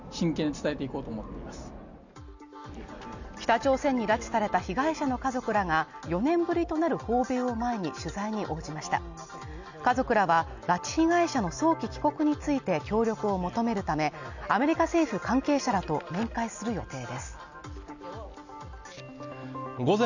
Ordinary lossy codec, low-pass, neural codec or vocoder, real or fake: none; 7.2 kHz; none; real